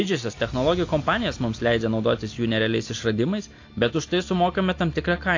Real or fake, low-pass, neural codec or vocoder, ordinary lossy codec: real; 7.2 kHz; none; AAC, 48 kbps